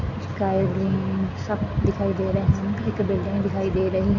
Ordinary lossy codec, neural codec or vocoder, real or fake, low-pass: none; none; real; 7.2 kHz